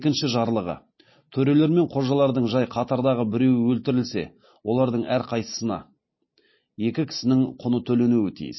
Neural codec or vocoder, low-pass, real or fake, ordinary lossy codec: none; 7.2 kHz; real; MP3, 24 kbps